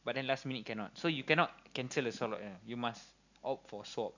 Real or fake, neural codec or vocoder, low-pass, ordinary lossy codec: real; none; 7.2 kHz; none